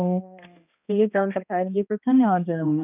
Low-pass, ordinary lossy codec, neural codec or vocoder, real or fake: 3.6 kHz; none; codec, 16 kHz, 1 kbps, X-Codec, HuBERT features, trained on balanced general audio; fake